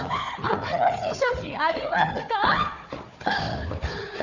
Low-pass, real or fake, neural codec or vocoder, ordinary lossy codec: 7.2 kHz; fake; codec, 16 kHz, 4 kbps, FunCodec, trained on Chinese and English, 50 frames a second; none